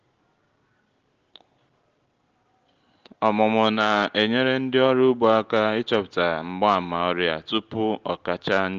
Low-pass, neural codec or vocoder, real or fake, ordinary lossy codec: 7.2 kHz; none; real; Opus, 16 kbps